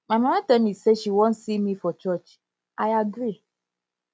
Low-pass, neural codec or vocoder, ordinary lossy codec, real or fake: none; none; none; real